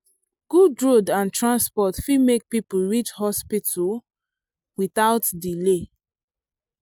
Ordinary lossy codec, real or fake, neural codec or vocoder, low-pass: none; real; none; none